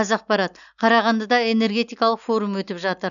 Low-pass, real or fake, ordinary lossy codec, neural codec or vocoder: 7.2 kHz; real; none; none